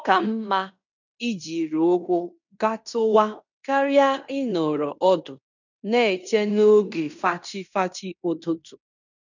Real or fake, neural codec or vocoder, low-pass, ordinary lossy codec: fake; codec, 16 kHz in and 24 kHz out, 0.9 kbps, LongCat-Audio-Codec, fine tuned four codebook decoder; 7.2 kHz; none